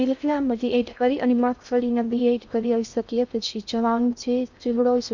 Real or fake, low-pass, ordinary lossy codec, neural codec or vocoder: fake; 7.2 kHz; none; codec, 16 kHz in and 24 kHz out, 0.6 kbps, FocalCodec, streaming, 2048 codes